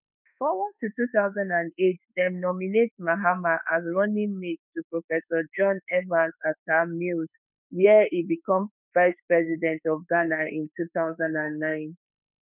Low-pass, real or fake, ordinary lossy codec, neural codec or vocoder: 3.6 kHz; fake; none; autoencoder, 48 kHz, 32 numbers a frame, DAC-VAE, trained on Japanese speech